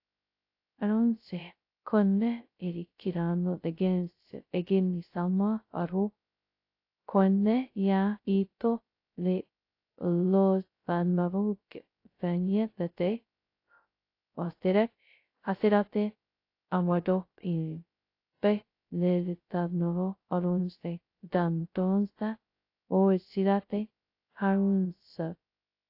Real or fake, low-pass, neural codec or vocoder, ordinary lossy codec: fake; 5.4 kHz; codec, 16 kHz, 0.2 kbps, FocalCodec; AAC, 32 kbps